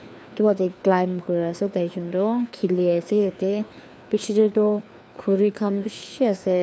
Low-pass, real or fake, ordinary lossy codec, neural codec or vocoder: none; fake; none; codec, 16 kHz, 2 kbps, FreqCodec, larger model